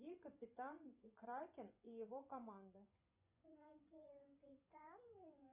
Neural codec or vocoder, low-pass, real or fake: vocoder, 24 kHz, 100 mel bands, Vocos; 3.6 kHz; fake